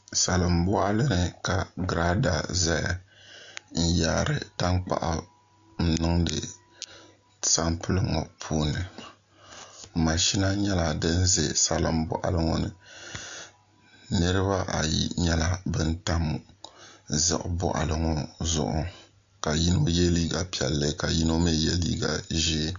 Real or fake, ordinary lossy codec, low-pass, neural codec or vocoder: real; MP3, 64 kbps; 7.2 kHz; none